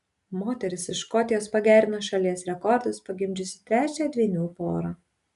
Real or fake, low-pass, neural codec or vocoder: real; 10.8 kHz; none